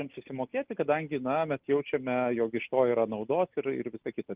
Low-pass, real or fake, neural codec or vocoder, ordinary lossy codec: 3.6 kHz; real; none; Opus, 32 kbps